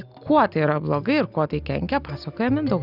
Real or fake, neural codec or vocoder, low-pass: real; none; 5.4 kHz